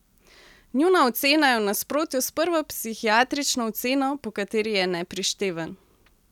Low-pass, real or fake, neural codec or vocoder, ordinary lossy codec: 19.8 kHz; real; none; none